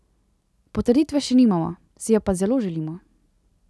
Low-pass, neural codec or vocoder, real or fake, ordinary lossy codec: none; none; real; none